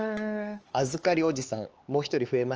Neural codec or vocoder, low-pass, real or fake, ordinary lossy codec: codec, 16 kHz, 4 kbps, X-Codec, HuBERT features, trained on LibriSpeech; 7.2 kHz; fake; Opus, 24 kbps